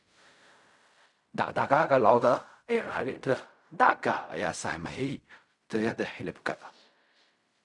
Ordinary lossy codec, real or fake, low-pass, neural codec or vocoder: none; fake; 10.8 kHz; codec, 16 kHz in and 24 kHz out, 0.4 kbps, LongCat-Audio-Codec, fine tuned four codebook decoder